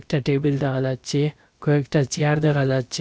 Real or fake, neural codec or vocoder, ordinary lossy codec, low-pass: fake; codec, 16 kHz, about 1 kbps, DyCAST, with the encoder's durations; none; none